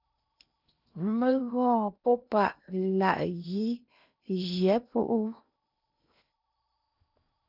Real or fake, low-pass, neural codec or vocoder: fake; 5.4 kHz; codec, 16 kHz in and 24 kHz out, 0.8 kbps, FocalCodec, streaming, 65536 codes